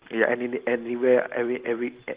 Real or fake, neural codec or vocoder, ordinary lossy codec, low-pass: real; none; Opus, 16 kbps; 3.6 kHz